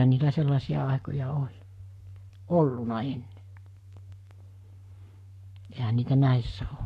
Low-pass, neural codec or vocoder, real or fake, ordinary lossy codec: 14.4 kHz; codec, 44.1 kHz, 7.8 kbps, Pupu-Codec; fake; none